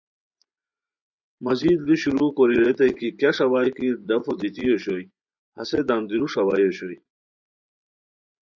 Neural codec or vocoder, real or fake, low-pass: vocoder, 24 kHz, 100 mel bands, Vocos; fake; 7.2 kHz